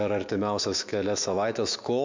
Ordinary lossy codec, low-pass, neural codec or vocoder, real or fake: MP3, 64 kbps; 7.2 kHz; autoencoder, 48 kHz, 128 numbers a frame, DAC-VAE, trained on Japanese speech; fake